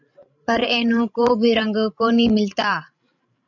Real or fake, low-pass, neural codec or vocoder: fake; 7.2 kHz; codec, 16 kHz, 16 kbps, FreqCodec, larger model